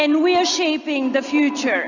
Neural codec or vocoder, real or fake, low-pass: none; real; 7.2 kHz